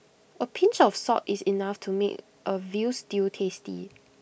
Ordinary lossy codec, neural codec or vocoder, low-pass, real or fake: none; none; none; real